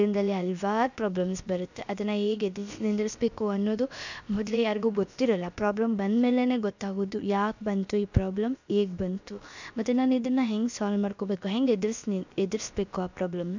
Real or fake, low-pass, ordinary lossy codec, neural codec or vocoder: fake; 7.2 kHz; none; codec, 16 kHz, about 1 kbps, DyCAST, with the encoder's durations